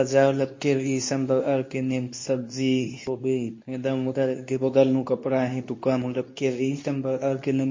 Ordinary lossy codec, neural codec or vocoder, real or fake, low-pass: MP3, 32 kbps; codec, 24 kHz, 0.9 kbps, WavTokenizer, medium speech release version 2; fake; 7.2 kHz